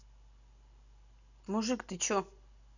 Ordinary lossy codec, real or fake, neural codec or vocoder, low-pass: none; real; none; 7.2 kHz